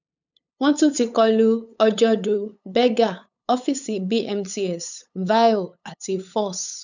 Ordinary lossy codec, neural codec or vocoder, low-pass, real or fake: none; codec, 16 kHz, 8 kbps, FunCodec, trained on LibriTTS, 25 frames a second; 7.2 kHz; fake